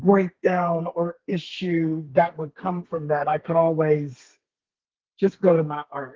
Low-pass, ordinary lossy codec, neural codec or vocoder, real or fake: 7.2 kHz; Opus, 16 kbps; codec, 32 kHz, 1.9 kbps, SNAC; fake